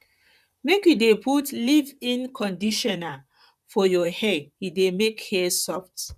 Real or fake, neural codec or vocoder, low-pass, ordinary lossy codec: fake; vocoder, 44.1 kHz, 128 mel bands, Pupu-Vocoder; 14.4 kHz; none